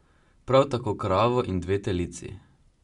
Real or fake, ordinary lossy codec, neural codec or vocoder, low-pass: real; MP3, 64 kbps; none; 10.8 kHz